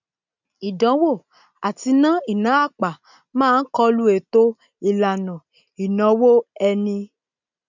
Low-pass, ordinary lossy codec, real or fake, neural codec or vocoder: 7.2 kHz; none; real; none